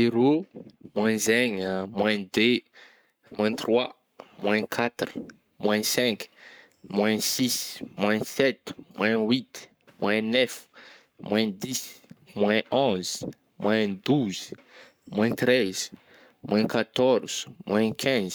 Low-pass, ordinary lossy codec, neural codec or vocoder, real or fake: none; none; codec, 44.1 kHz, 7.8 kbps, Pupu-Codec; fake